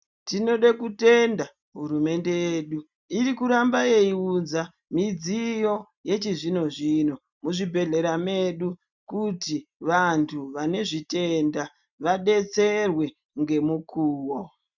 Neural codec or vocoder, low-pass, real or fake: none; 7.2 kHz; real